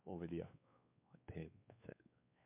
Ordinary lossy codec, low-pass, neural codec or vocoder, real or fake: MP3, 32 kbps; 3.6 kHz; codec, 16 kHz, 4 kbps, X-Codec, WavLM features, trained on Multilingual LibriSpeech; fake